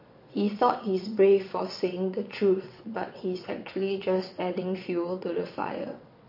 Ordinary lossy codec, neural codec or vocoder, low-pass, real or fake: MP3, 32 kbps; vocoder, 22.05 kHz, 80 mel bands, WaveNeXt; 5.4 kHz; fake